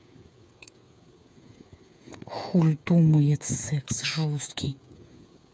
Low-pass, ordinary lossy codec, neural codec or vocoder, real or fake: none; none; codec, 16 kHz, 8 kbps, FreqCodec, smaller model; fake